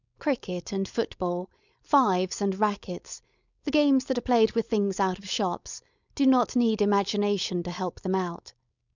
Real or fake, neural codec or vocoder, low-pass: fake; codec, 16 kHz, 4.8 kbps, FACodec; 7.2 kHz